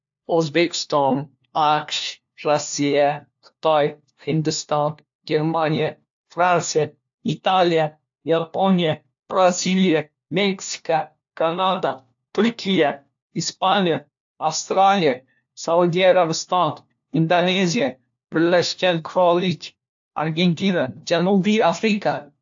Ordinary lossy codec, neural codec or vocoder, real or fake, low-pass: AAC, 64 kbps; codec, 16 kHz, 1 kbps, FunCodec, trained on LibriTTS, 50 frames a second; fake; 7.2 kHz